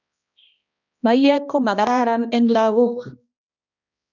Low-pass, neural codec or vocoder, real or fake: 7.2 kHz; codec, 16 kHz, 1 kbps, X-Codec, HuBERT features, trained on balanced general audio; fake